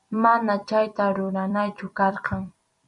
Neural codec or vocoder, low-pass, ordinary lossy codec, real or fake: vocoder, 44.1 kHz, 128 mel bands every 256 samples, BigVGAN v2; 10.8 kHz; MP3, 96 kbps; fake